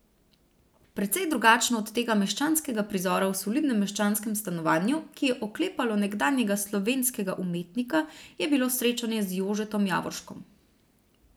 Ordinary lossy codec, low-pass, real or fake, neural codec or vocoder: none; none; real; none